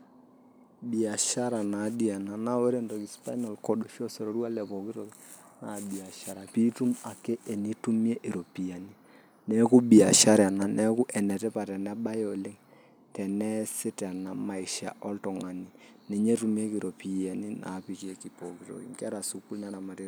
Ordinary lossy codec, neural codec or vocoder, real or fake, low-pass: none; none; real; none